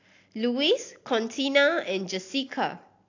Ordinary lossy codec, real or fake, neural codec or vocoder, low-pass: AAC, 48 kbps; real; none; 7.2 kHz